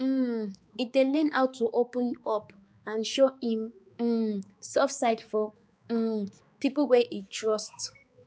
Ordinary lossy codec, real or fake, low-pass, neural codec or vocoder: none; fake; none; codec, 16 kHz, 4 kbps, X-Codec, HuBERT features, trained on balanced general audio